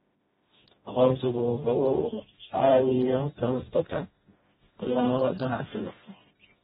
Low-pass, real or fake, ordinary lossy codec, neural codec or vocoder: 7.2 kHz; fake; AAC, 16 kbps; codec, 16 kHz, 1 kbps, FreqCodec, smaller model